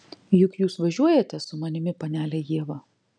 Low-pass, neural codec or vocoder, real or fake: 9.9 kHz; none; real